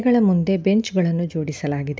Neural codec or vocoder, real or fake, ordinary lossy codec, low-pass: none; real; none; none